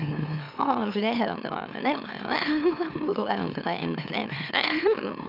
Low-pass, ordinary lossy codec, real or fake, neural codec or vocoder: 5.4 kHz; none; fake; autoencoder, 44.1 kHz, a latent of 192 numbers a frame, MeloTTS